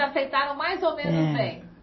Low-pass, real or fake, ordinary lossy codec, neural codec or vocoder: 7.2 kHz; real; MP3, 24 kbps; none